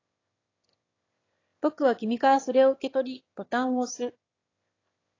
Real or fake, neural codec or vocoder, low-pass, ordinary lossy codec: fake; autoencoder, 22.05 kHz, a latent of 192 numbers a frame, VITS, trained on one speaker; 7.2 kHz; AAC, 32 kbps